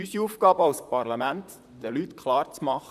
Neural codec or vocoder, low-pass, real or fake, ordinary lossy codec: vocoder, 44.1 kHz, 128 mel bands, Pupu-Vocoder; 14.4 kHz; fake; none